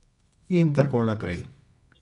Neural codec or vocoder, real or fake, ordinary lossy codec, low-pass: codec, 24 kHz, 0.9 kbps, WavTokenizer, medium music audio release; fake; none; 10.8 kHz